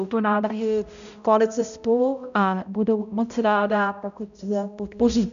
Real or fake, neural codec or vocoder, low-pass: fake; codec, 16 kHz, 0.5 kbps, X-Codec, HuBERT features, trained on balanced general audio; 7.2 kHz